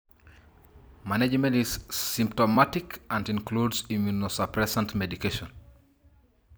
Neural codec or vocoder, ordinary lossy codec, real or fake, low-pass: vocoder, 44.1 kHz, 128 mel bands every 256 samples, BigVGAN v2; none; fake; none